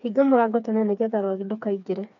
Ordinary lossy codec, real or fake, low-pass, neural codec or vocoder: none; fake; 7.2 kHz; codec, 16 kHz, 4 kbps, FreqCodec, smaller model